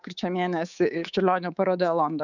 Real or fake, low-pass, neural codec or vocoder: fake; 7.2 kHz; codec, 24 kHz, 3.1 kbps, DualCodec